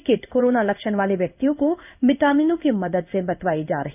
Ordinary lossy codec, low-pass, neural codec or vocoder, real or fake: none; 3.6 kHz; codec, 16 kHz in and 24 kHz out, 1 kbps, XY-Tokenizer; fake